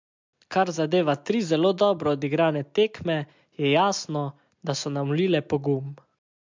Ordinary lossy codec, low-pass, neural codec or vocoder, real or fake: MP3, 64 kbps; 7.2 kHz; none; real